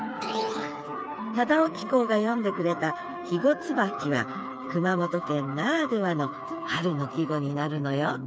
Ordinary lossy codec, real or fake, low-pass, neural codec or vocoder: none; fake; none; codec, 16 kHz, 4 kbps, FreqCodec, smaller model